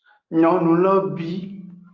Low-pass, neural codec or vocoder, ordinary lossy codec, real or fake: 7.2 kHz; none; Opus, 24 kbps; real